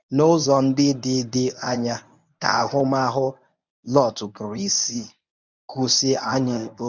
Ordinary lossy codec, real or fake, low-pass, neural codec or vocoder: none; fake; 7.2 kHz; codec, 24 kHz, 0.9 kbps, WavTokenizer, medium speech release version 1